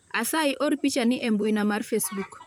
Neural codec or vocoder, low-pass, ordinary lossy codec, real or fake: vocoder, 44.1 kHz, 128 mel bands, Pupu-Vocoder; none; none; fake